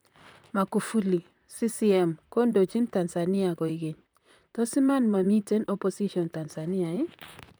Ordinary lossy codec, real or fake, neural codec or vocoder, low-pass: none; fake; vocoder, 44.1 kHz, 128 mel bands, Pupu-Vocoder; none